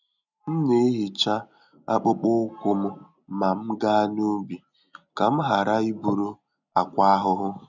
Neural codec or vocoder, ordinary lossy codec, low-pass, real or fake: none; none; 7.2 kHz; real